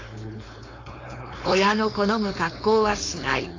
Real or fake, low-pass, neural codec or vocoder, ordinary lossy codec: fake; 7.2 kHz; codec, 16 kHz, 4.8 kbps, FACodec; AAC, 32 kbps